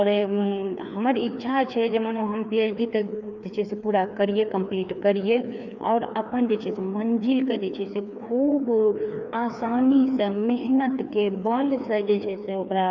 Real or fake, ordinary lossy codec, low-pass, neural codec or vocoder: fake; none; 7.2 kHz; codec, 16 kHz, 2 kbps, FreqCodec, larger model